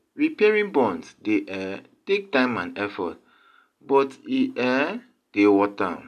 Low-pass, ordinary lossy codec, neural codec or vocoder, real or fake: 14.4 kHz; none; none; real